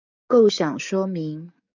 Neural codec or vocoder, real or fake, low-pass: codec, 44.1 kHz, 7.8 kbps, DAC; fake; 7.2 kHz